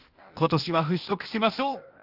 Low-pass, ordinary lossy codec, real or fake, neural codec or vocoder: 5.4 kHz; Opus, 32 kbps; fake; codec, 16 kHz, 0.8 kbps, ZipCodec